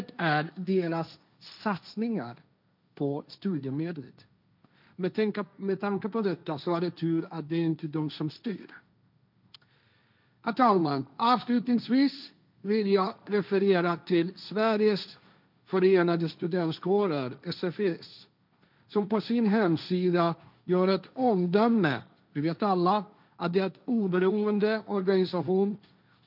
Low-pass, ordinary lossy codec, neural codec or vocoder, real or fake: 5.4 kHz; none; codec, 16 kHz, 1.1 kbps, Voila-Tokenizer; fake